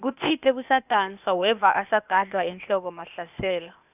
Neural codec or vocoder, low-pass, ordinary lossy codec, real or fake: codec, 16 kHz, 0.8 kbps, ZipCodec; 3.6 kHz; none; fake